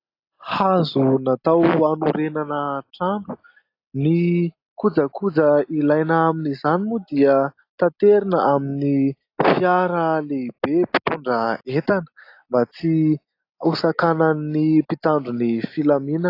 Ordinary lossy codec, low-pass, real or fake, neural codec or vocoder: AAC, 32 kbps; 5.4 kHz; real; none